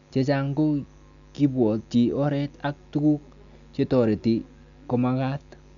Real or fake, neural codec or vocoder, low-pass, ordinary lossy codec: real; none; 7.2 kHz; none